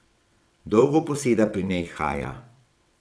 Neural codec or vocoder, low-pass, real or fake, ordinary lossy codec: vocoder, 22.05 kHz, 80 mel bands, WaveNeXt; none; fake; none